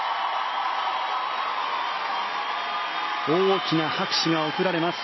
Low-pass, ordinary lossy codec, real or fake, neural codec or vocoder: 7.2 kHz; MP3, 24 kbps; real; none